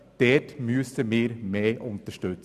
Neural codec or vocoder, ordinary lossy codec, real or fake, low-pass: none; none; real; 14.4 kHz